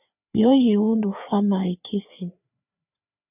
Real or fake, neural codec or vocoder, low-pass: fake; codec, 16 kHz, 4 kbps, FreqCodec, larger model; 3.6 kHz